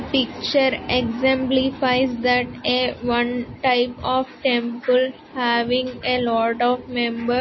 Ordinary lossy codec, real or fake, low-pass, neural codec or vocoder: MP3, 24 kbps; real; 7.2 kHz; none